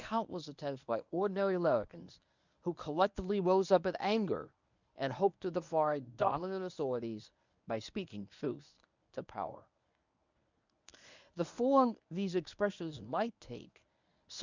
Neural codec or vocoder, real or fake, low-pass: codec, 24 kHz, 0.9 kbps, WavTokenizer, medium speech release version 1; fake; 7.2 kHz